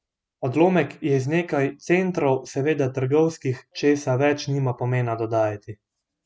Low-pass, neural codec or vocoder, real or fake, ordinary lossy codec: none; none; real; none